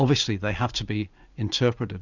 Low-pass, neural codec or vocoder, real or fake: 7.2 kHz; none; real